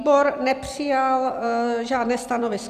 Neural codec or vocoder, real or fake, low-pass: vocoder, 44.1 kHz, 128 mel bands every 256 samples, BigVGAN v2; fake; 14.4 kHz